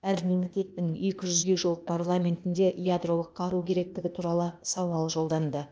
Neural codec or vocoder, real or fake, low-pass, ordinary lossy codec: codec, 16 kHz, 0.8 kbps, ZipCodec; fake; none; none